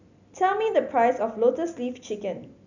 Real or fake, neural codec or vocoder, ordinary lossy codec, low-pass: real; none; none; 7.2 kHz